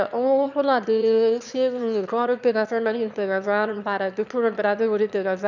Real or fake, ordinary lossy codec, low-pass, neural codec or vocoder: fake; none; 7.2 kHz; autoencoder, 22.05 kHz, a latent of 192 numbers a frame, VITS, trained on one speaker